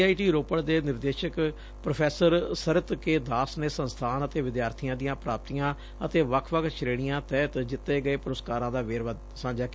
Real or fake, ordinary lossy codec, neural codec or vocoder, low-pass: real; none; none; none